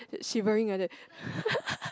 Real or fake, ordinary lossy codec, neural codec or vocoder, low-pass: real; none; none; none